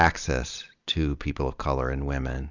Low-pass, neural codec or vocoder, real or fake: 7.2 kHz; vocoder, 44.1 kHz, 128 mel bands every 256 samples, BigVGAN v2; fake